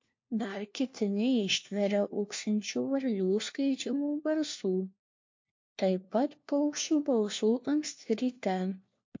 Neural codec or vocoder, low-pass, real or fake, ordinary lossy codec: codec, 16 kHz, 1 kbps, FunCodec, trained on LibriTTS, 50 frames a second; 7.2 kHz; fake; MP3, 48 kbps